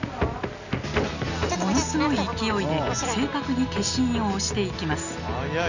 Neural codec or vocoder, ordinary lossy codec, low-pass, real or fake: none; none; 7.2 kHz; real